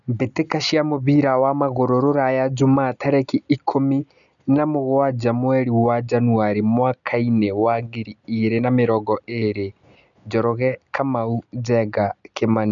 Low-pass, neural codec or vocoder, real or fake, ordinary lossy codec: 7.2 kHz; none; real; none